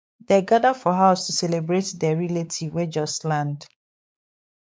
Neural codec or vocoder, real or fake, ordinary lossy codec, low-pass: codec, 16 kHz, 4 kbps, X-Codec, WavLM features, trained on Multilingual LibriSpeech; fake; none; none